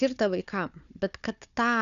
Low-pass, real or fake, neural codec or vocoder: 7.2 kHz; fake; codec, 16 kHz, 4 kbps, FunCodec, trained on Chinese and English, 50 frames a second